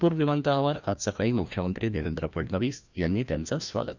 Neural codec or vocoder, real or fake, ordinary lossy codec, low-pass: codec, 16 kHz, 1 kbps, FreqCodec, larger model; fake; none; 7.2 kHz